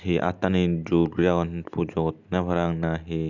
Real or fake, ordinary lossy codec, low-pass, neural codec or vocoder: real; none; 7.2 kHz; none